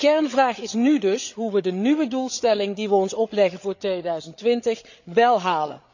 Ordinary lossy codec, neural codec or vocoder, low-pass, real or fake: none; codec, 16 kHz, 8 kbps, FreqCodec, larger model; 7.2 kHz; fake